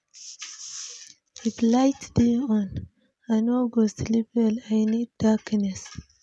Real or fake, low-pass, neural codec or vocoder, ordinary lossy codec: real; none; none; none